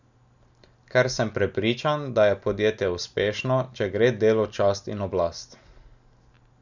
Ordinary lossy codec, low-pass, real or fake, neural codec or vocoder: none; 7.2 kHz; real; none